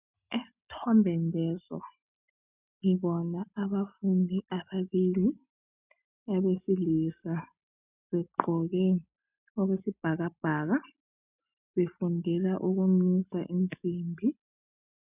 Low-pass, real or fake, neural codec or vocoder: 3.6 kHz; real; none